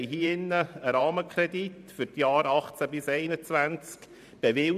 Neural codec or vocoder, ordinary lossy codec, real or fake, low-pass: vocoder, 44.1 kHz, 128 mel bands every 512 samples, BigVGAN v2; none; fake; 14.4 kHz